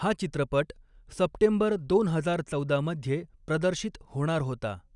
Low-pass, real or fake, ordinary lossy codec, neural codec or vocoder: 10.8 kHz; real; none; none